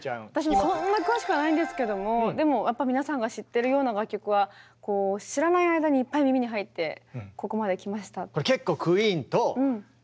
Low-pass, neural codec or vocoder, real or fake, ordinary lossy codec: none; none; real; none